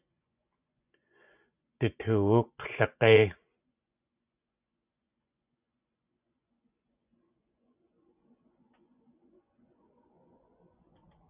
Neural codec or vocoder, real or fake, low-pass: vocoder, 24 kHz, 100 mel bands, Vocos; fake; 3.6 kHz